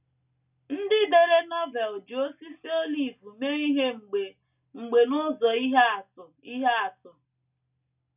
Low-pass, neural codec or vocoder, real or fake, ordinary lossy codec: 3.6 kHz; none; real; none